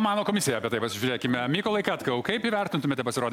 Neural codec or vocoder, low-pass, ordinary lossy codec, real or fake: none; 19.8 kHz; MP3, 96 kbps; real